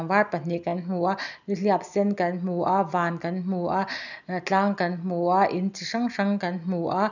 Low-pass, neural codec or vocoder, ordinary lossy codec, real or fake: 7.2 kHz; none; none; real